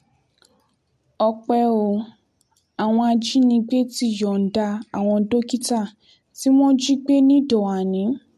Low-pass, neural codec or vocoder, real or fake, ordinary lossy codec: 14.4 kHz; none; real; MP3, 64 kbps